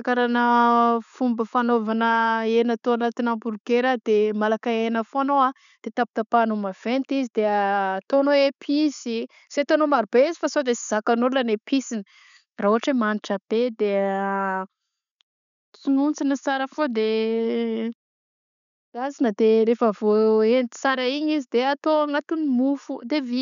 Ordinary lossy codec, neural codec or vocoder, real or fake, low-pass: MP3, 96 kbps; none; real; 7.2 kHz